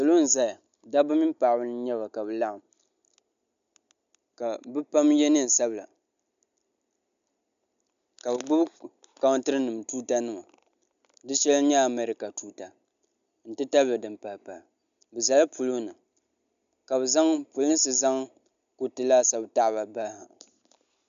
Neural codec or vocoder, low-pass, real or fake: none; 7.2 kHz; real